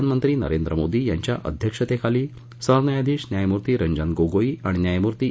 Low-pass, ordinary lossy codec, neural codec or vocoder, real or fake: none; none; none; real